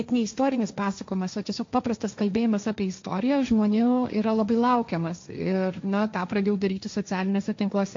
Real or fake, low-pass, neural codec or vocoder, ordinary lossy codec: fake; 7.2 kHz; codec, 16 kHz, 1.1 kbps, Voila-Tokenizer; MP3, 48 kbps